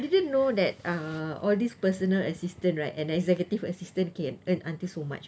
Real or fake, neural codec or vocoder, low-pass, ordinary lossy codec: real; none; none; none